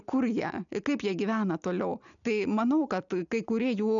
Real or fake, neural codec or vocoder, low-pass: real; none; 7.2 kHz